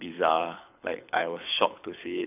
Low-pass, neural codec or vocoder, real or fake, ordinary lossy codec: 3.6 kHz; codec, 24 kHz, 6 kbps, HILCodec; fake; none